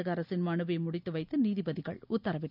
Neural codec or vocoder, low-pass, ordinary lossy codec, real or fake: none; 5.4 kHz; none; real